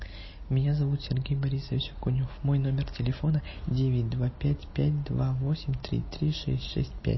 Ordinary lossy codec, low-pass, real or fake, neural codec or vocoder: MP3, 24 kbps; 7.2 kHz; real; none